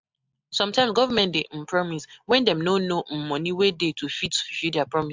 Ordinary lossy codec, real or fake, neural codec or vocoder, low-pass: MP3, 64 kbps; real; none; 7.2 kHz